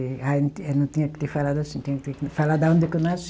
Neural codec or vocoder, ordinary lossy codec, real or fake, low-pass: none; none; real; none